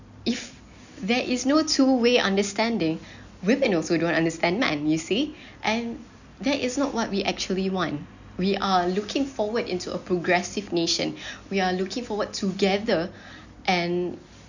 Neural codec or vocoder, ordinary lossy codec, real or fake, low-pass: none; none; real; 7.2 kHz